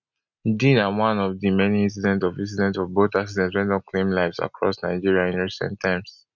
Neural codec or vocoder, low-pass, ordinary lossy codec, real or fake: none; 7.2 kHz; none; real